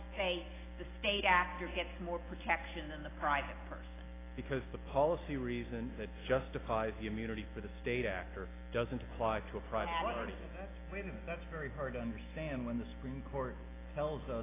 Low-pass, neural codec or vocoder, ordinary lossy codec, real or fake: 3.6 kHz; none; AAC, 16 kbps; real